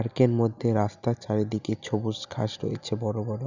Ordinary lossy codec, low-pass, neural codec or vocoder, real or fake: none; 7.2 kHz; none; real